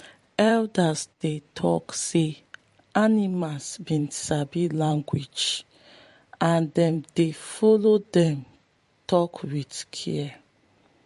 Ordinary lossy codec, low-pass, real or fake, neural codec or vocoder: MP3, 48 kbps; 14.4 kHz; fake; vocoder, 44.1 kHz, 128 mel bands every 512 samples, BigVGAN v2